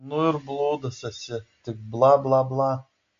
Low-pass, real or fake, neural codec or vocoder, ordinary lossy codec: 7.2 kHz; real; none; AAC, 48 kbps